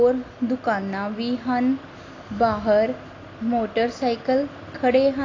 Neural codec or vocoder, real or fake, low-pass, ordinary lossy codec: none; real; 7.2 kHz; AAC, 32 kbps